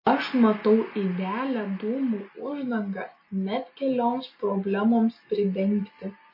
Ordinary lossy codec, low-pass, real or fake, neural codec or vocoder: MP3, 24 kbps; 5.4 kHz; real; none